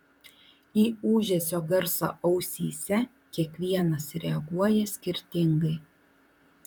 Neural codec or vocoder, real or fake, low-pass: vocoder, 44.1 kHz, 128 mel bands, Pupu-Vocoder; fake; 19.8 kHz